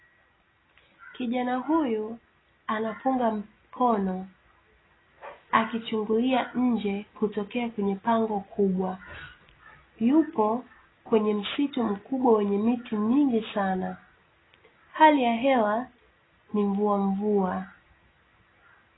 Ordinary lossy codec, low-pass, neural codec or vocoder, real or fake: AAC, 16 kbps; 7.2 kHz; none; real